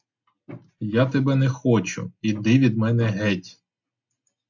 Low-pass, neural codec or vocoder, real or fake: 7.2 kHz; none; real